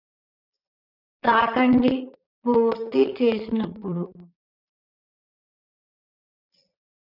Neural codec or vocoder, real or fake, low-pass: vocoder, 22.05 kHz, 80 mel bands, Vocos; fake; 5.4 kHz